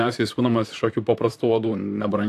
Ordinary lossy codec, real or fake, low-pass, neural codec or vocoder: AAC, 64 kbps; fake; 14.4 kHz; vocoder, 44.1 kHz, 128 mel bands, Pupu-Vocoder